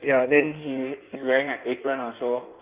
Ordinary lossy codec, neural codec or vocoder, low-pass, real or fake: Opus, 24 kbps; codec, 16 kHz in and 24 kHz out, 1.1 kbps, FireRedTTS-2 codec; 3.6 kHz; fake